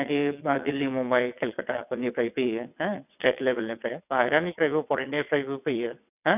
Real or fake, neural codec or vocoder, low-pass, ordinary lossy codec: fake; vocoder, 22.05 kHz, 80 mel bands, WaveNeXt; 3.6 kHz; none